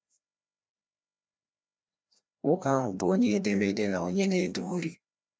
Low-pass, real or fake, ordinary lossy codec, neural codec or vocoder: none; fake; none; codec, 16 kHz, 1 kbps, FreqCodec, larger model